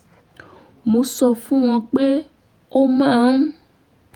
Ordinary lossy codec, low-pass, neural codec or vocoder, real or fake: Opus, 32 kbps; 19.8 kHz; vocoder, 48 kHz, 128 mel bands, Vocos; fake